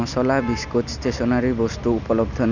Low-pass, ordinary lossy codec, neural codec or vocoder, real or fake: 7.2 kHz; none; none; real